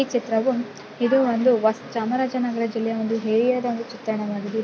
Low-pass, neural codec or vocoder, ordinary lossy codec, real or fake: none; none; none; real